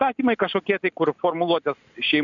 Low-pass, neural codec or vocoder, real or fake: 7.2 kHz; none; real